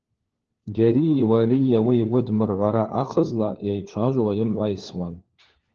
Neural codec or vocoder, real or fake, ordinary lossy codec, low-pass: codec, 16 kHz, 4 kbps, FunCodec, trained on LibriTTS, 50 frames a second; fake; Opus, 16 kbps; 7.2 kHz